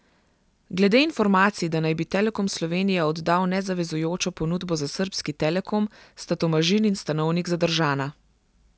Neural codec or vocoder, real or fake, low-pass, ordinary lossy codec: none; real; none; none